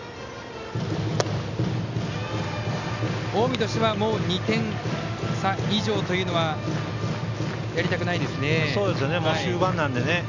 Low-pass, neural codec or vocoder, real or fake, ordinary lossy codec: 7.2 kHz; none; real; none